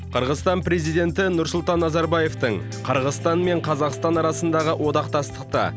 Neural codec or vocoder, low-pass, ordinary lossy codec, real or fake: none; none; none; real